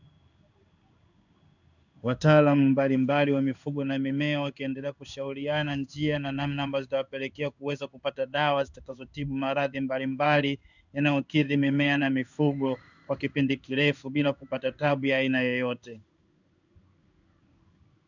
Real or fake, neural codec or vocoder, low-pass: fake; codec, 16 kHz in and 24 kHz out, 1 kbps, XY-Tokenizer; 7.2 kHz